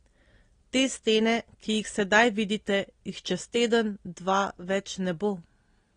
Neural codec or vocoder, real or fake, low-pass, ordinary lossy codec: none; real; 9.9 kHz; AAC, 32 kbps